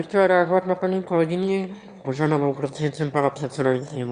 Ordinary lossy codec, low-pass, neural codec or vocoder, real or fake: Opus, 64 kbps; 9.9 kHz; autoencoder, 22.05 kHz, a latent of 192 numbers a frame, VITS, trained on one speaker; fake